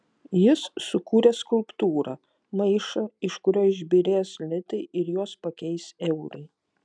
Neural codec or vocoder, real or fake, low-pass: none; real; 9.9 kHz